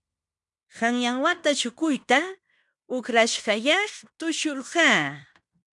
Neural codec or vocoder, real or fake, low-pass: codec, 16 kHz in and 24 kHz out, 0.9 kbps, LongCat-Audio-Codec, fine tuned four codebook decoder; fake; 10.8 kHz